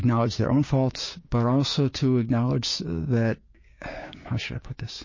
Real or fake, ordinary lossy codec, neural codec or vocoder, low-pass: fake; MP3, 32 kbps; codec, 16 kHz, 6 kbps, DAC; 7.2 kHz